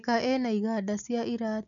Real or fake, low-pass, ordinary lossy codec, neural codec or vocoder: real; 7.2 kHz; none; none